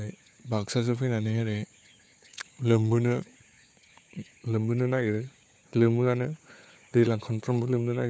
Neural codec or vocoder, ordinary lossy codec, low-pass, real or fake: codec, 16 kHz, 4 kbps, FunCodec, trained on Chinese and English, 50 frames a second; none; none; fake